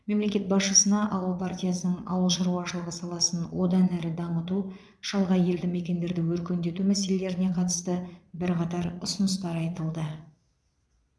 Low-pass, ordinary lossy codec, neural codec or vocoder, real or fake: none; none; vocoder, 22.05 kHz, 80 mel bands, Vocos; fake